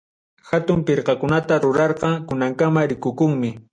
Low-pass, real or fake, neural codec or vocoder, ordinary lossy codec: 9.9 kHz; real; none; MP3, 48 kbps